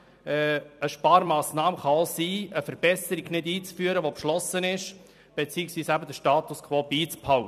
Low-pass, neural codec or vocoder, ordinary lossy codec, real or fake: 14.4 kHz; vocoder, 44.1 kHz, 128 mel bands every 256 samples, BigVGAN v2; none; fake